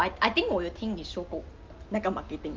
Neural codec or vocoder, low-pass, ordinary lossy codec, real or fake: none; 7.2 kHz; Opus, 32 kbps; real